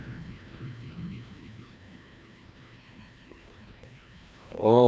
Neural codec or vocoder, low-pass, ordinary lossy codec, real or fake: codec, 16 kHz, 1 kbps, FreqCodec, larger model; none; none; fake